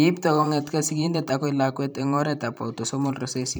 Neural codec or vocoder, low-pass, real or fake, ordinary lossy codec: vocoder, 44.1 kHz, 128 mel bands every 512 samples, BigVGAN v2; none; fake; none